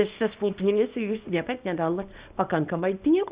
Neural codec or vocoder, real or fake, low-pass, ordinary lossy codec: codec, 24 kHz, 0.9 kbps, WavTokenizer, small release; fake; 3.6 kHz; Opus, 64 kbps